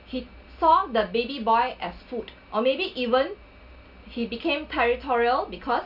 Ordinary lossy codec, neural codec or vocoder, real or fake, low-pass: none; none; real; 5.4 kHz